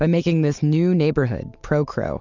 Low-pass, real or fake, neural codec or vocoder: 7.2 kHz; real; none